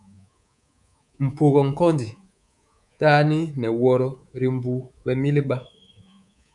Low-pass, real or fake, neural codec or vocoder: 10.8 kHz; fake; codec, 24 kHz, 3.1 kbps, DualCodec